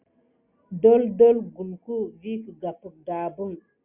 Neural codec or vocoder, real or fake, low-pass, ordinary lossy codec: none; real; 3.6 kHz; Opus, 64 kbps